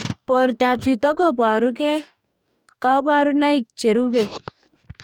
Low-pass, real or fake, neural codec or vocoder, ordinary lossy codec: 19.8 kHz; fake; codec, 44.1 kHz, 2.6 kbps, DAC; none